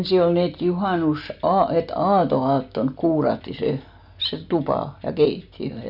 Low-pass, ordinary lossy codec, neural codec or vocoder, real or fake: 5.4 kHz; none; none; real